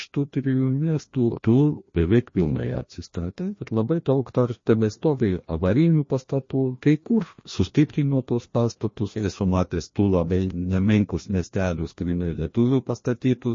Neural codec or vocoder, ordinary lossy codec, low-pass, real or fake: codec, 16 kHz, 1 kbps, FreqCodec, larger model; MP3, 32 kbps; 7.2 kHz; fake